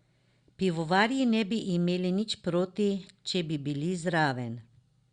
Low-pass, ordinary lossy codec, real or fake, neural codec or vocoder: 9.9 kHz; Opus, 64 kbps; real; none